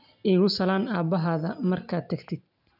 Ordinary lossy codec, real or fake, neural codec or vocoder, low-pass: none; real; none; 5.4 kHz